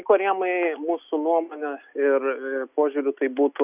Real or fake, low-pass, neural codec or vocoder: real; 3.6 kHz; none